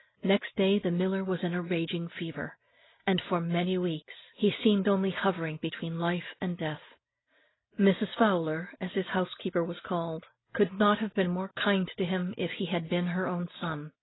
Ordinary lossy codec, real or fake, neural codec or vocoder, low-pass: AAC, 16 kbps; real; none; 7.2 kHz